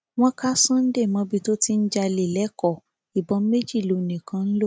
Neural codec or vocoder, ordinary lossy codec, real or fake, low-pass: none; none; real; none